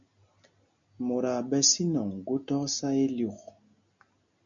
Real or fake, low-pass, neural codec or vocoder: real; 7.2 kHz; none